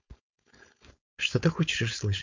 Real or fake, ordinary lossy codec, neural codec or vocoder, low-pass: fake; MP3, 64 kbps; codec, 16 kHz, 4.8 kbps, FACodec; 7.2 kHz